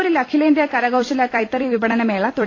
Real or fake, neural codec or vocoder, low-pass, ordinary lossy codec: fake; vocoder, 44.1 kHz, 128 mel bands every 256 samples, BigVGAN v2; 7.2 kHz; AAC, 32 kbps